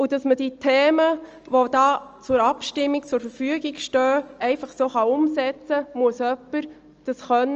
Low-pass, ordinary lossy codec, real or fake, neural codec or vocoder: 7.2 kHz; Opus, 24 kbps; real; none